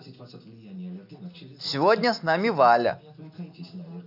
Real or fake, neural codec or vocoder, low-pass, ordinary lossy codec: real; none; 5.4 kHz; none